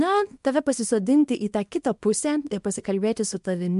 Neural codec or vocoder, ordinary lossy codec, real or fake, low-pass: codec, 24 kHz, 0.9 kbps, WavTokenizer, small release; MP3, 64 kbps; fake; 10.8 kHz